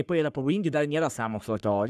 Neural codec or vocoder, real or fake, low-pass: codec, 44.1 kHz, 3.4 kbps, Pupu-Codec; fake; 14.4 kHz